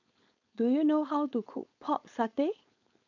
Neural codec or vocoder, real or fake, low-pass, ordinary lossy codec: codec, 16 kHz, 4.8 kbps, FACodec; fake; 7.2 kHz; none